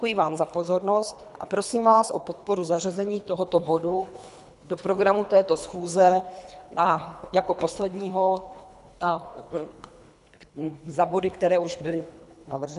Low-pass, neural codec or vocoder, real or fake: 10.8 kHz; codec, 24 kHz, 3 kbps, HILCodec; fake